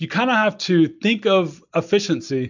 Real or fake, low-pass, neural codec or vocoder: real; 7.2 kHz; none